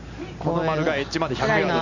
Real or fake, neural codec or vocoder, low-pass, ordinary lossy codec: real; none; 7.2 kHz; none